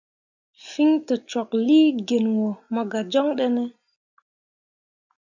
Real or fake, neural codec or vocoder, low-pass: real; none; 7.2 kHz